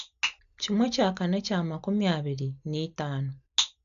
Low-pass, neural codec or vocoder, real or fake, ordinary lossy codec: 7.2 kHz; none; real; none